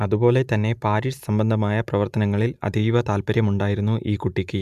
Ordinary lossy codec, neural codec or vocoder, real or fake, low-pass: none; none; real; 14.4 kHz